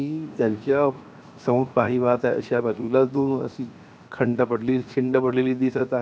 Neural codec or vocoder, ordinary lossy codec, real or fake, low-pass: codec, 16 kHz, 0.7 kbps, FocalCodec; none; fake; none